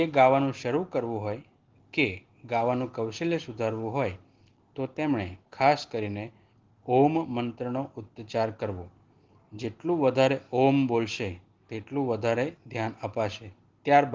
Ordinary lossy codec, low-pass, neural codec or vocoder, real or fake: Opus, 16 kbps; 7.2 kHz; none; real